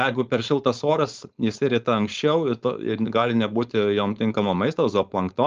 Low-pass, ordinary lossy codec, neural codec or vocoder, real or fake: 7.2 kHz; Opus, 24 kbps; codec, 16 kHz, 4.8 kbps, FACodec; fake